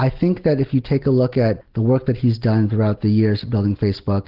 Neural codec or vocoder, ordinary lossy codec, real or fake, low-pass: none; Opus, 16 kbps; real; 5.4 kHz